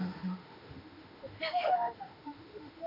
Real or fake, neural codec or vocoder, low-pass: fake; autoencoder, 48 kHz, 32 numbers a frame, DAC-VAE, trained on Japanese speech; 5.4 kHz